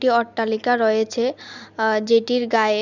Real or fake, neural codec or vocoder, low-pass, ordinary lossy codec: real; none; 7.2 kHz; none